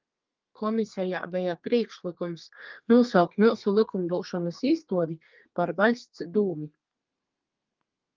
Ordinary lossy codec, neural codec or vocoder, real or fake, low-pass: Opus, 24 kbps; codec, 32 kHz, 1.9 kbps, SNAC; fake; 7.2 kHz